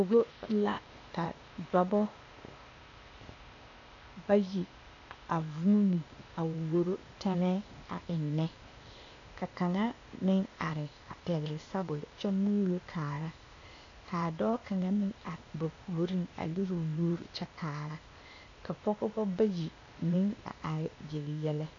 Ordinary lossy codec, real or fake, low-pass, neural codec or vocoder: AAC, 48 kbps; fake; 7.2 kHz; codec, 16 kHz, 0.8 kbps, ZipCodec